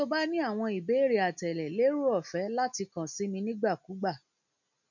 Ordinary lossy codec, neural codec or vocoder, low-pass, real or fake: none; none; 7.2 kHz; real